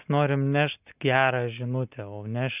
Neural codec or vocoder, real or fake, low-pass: none; real; 3.6 kHz